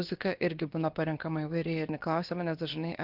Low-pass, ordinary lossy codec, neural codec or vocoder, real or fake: 5.4 kHz; Opus, 32 kbps; codec, 16 kHz, about 1 kbps, DyCAST, with the encoder's durations; fake